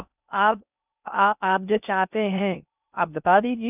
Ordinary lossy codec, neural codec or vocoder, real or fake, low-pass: none; codec, 16 kHz in and 24 kHz out, 0.6 kbps, FocalCodec, streaming, 2048 codes; fake; 3.6 kHz